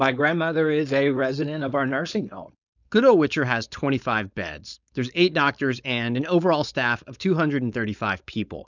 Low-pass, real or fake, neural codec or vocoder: 7.2 kHz; fake; codec, 16 kHz, 4.8 kbps, FACodec